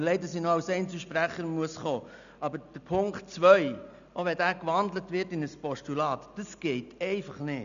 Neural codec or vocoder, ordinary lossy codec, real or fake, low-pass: none; none; real; 7.2 kHz